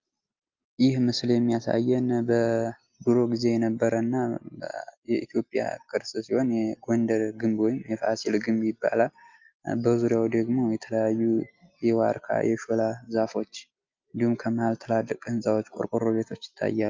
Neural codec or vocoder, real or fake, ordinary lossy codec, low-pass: none; real; Opus, 24 kbps; 7.2 kHz